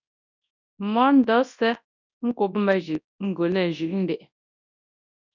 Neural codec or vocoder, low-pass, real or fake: codec, 24 kHz, 0.9 kbps, WavTokenizer, large speech release; 7.2 kHz; fake